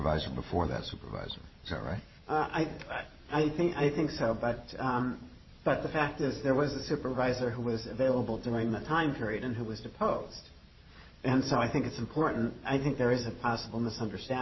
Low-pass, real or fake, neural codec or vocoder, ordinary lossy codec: 7.2 kHz; fake; vocoder, 44.1 kHz, 128 mel bands every 512 samples, BigVGAN v2; MP3, 24 kbps